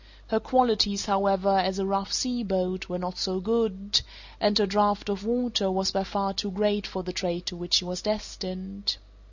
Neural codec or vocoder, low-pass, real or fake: none; 7.2 kHz; real